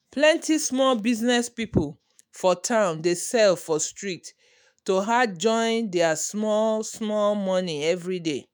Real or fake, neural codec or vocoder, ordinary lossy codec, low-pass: fake; autoencoder, 48 kHz, 128 numbers a frame, DAC-VAE, trained on Japanese speech; none; none